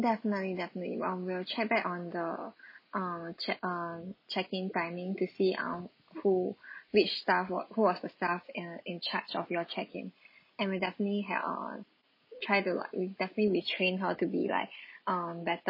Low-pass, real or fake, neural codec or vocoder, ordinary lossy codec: 5.4 kHz; real; none; MP3, 24 kbps